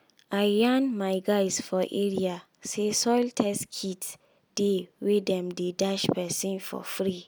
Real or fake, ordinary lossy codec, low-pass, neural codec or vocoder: real; none; none; none